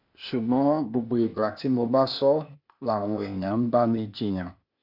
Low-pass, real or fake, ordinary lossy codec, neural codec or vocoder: 5.4 kHz; fake; none; codec, 16 kHz, 0.8 kbps, ZipCodec